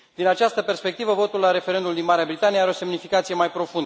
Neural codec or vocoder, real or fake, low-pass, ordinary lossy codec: none; real; none; none